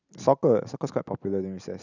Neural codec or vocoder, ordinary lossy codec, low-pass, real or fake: none; none; 7.2 kHz; real